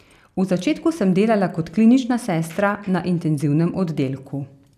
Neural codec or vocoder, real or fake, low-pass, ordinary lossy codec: none; real; 14.4 kHz; none